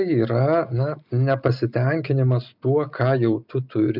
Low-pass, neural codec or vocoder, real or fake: 5.4 kHz; none; real